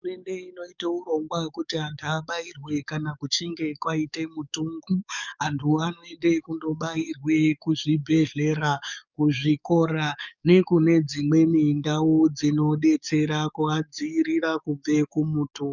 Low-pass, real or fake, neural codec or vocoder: 7.2 kHz; fake; codec, 44.1 kHz, 7.8 kbps, DAC